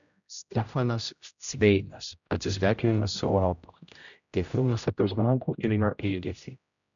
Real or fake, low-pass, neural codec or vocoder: fake; 7.2 kHz; codec, 16 kHz, 0.5 kbps, X-Codec, HuBERT features, trained on general audio